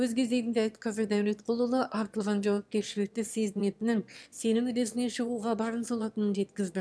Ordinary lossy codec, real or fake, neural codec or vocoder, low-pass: none; fake; autoencoder, 22.05 kHz, a latent of 192 numbers a frame, VITS, trained on one speaker; none